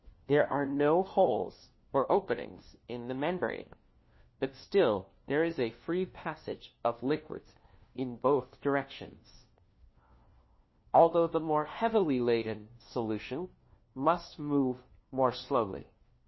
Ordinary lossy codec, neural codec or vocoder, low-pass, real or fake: MP3, 24 kbps; codec, 16 kHz, 1 kbps, FunCodec, trained on LibriTTS, 50 frames a second; 7.2 kHz; fake